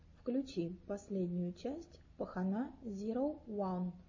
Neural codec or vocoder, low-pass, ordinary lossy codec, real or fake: none; 7.2 kHz; MP3, 32 kbps; real